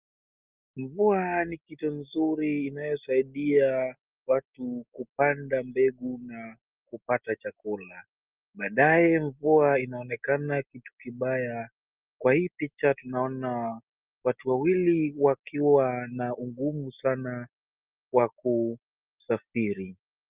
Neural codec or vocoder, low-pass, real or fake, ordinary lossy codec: none; 3.6 kHz; real; Opus, 32 kbps